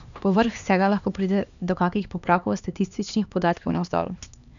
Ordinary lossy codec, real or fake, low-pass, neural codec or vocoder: none; fake; 7.2 kHz; codec, 16 kHz, 2 kbps, X-Codec, HuBERT features, trained on LibriSpeech